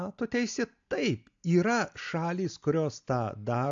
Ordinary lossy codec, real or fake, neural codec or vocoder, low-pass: AAC, 64 kbps; real; none; 7.2 kHz